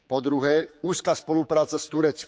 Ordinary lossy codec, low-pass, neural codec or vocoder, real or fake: none; none; codec, 16 kHz, 4 kbps, X-Codec, HuBERT features, trained on general audio; fake